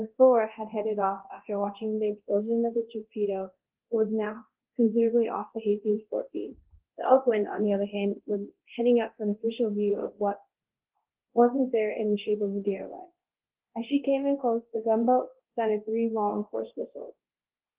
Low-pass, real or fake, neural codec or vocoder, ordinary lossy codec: 3.6 kHz; fake; codec, 24 kHz, 0.9 kbps, DualCodec; Opus, 16 kbps